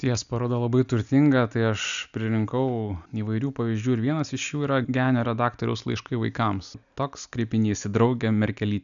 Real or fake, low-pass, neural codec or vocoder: real; 7.2 kHz; none